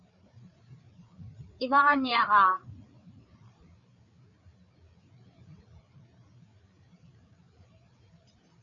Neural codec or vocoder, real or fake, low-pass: codec, 16 kHz, 4 kbps, FreqCodec, larger model; fake; 7.2 kHz